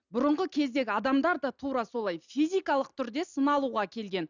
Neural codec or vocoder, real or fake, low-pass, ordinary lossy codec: none; real; 7.2 kHz; none